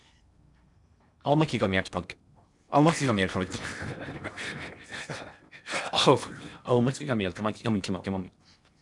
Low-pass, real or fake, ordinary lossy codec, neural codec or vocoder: 10.8 kHz; fake; MP3, 96 kbps; codec, 16 kHz in and 24 kHz out, 0.8 kbps, FocalCodec, streaming, 65536 codes